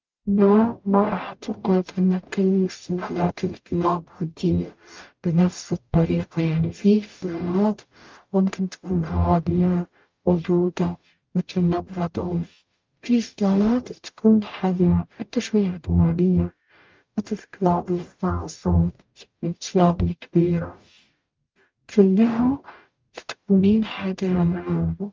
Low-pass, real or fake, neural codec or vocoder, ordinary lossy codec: 7.2 kHz; fake; codec, 44.1 kHz, 0.9 kbps, DAC; Opus, 24 kbps